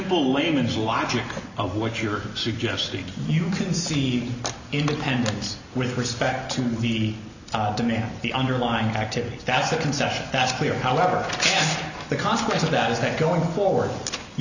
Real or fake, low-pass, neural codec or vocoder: real; 7.2 kHz; none